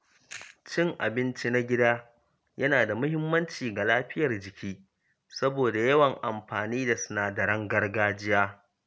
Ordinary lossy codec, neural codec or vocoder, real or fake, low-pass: none; none; real; none